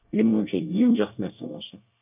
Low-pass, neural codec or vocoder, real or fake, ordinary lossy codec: 3.6 kHz; codec, 24 kHz, 1 kbps, SNAC; fake; none